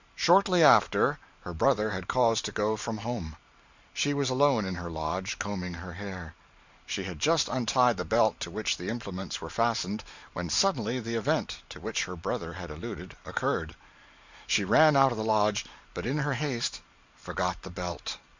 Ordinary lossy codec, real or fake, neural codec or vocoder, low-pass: Opus, 64 kbps; fake; vocoder, 44.1 kHz, 128 mel bands every 512 samples, BigVGAN v2; 7.2 kHz